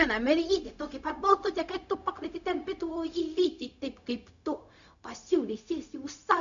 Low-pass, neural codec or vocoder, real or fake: 7.2 kHz; codec, 16 kHz, 0.4 kbps, LongCat-Audio-Codec; fake